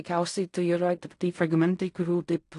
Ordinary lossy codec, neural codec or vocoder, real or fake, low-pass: AAC, 48 kbps; codec, 16 kHz in and 24 kHz out, 0.4 kbps, LongCat-Audio-Codec, fine tuned four codebook decoder; fake; 10.8 kHz